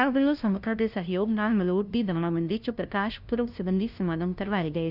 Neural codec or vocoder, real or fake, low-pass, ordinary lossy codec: codec, 16 kHz, 0.5 kbps, FunCodec, trained on LibriTTS, 25 frames a second; fake; 5.4 kHz; none